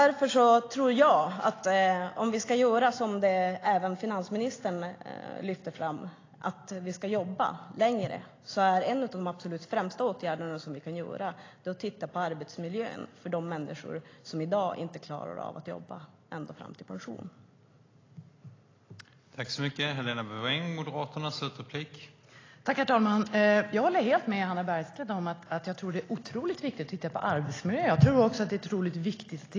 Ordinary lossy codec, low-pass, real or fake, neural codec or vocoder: AAC, 32 kbps; 7.2 kHz; real; none